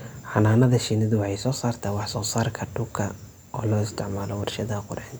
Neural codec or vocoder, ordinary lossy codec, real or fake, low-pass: none; none; real; none